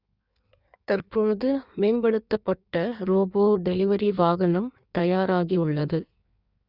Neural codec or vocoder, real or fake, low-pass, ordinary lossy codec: codec, 16 kHz in and 24 kHz out, 1.1 kbps, FireRedTTS-2 codec; fake; 5.4 kHz; Opus, 64 kbps